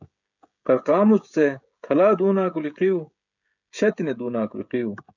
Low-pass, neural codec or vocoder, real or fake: 7.2 kHz; codec, 16 kHz, 16 kbps, FreqCodec, smaller model; fake